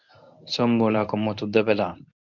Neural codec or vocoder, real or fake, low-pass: codec, 24 kHz, 0.9 kbps, WavTokenizer, medium speech release version 1; fake; 7.2 kHz